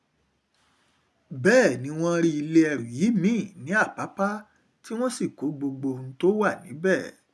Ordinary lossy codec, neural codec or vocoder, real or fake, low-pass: none; none; real; none